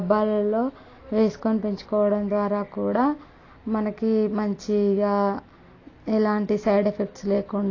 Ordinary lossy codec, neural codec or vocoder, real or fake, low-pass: AAC, 32 kbps; none; real; 7.2 kHz